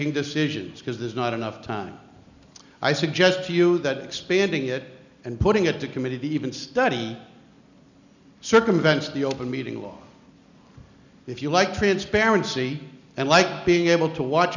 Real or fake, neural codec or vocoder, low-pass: real; none; 7.2 kHz